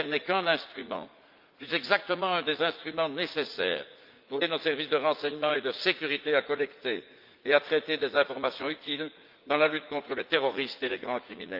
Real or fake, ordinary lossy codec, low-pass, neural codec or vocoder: fake; Opus, 24 kbps; 5.4 kHz; vocoder, 44.1 kHz, 80 mel bands, Vocos